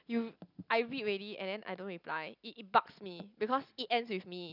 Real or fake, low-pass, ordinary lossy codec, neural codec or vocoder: real; 5.4 kHz; none; none